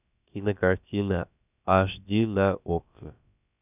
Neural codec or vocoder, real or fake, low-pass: codec, 16 kHz, about 1 kbps, DyCAST, with the encoder's durations; fake; 3.6 kHz